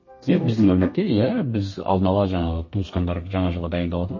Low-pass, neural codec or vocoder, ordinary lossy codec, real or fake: 7.2 kHz; codec, 32 kHz, 1.9 kbps, SNAC; MP3, 32 kbps; fake